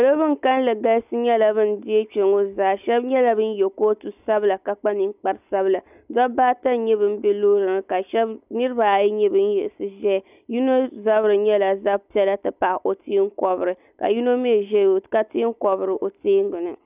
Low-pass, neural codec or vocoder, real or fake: 3.6 kHz; none; real